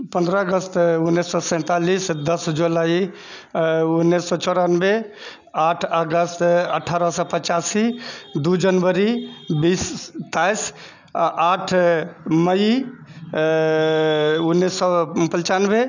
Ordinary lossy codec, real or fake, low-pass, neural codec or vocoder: none; real; 7.2 kHz; none